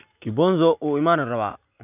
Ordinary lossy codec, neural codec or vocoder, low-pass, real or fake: none; none; 3.6 kHz; real